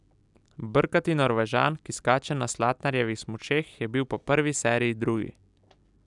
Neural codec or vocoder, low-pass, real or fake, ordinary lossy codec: none; 10.8 kHz; real; none